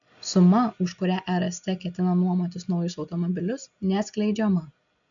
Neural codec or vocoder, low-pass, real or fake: none; 7.2 kHz; real